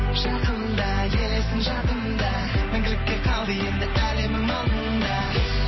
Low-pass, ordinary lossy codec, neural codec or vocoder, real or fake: 7.2 kHz; MP3, 24 kbps; none; real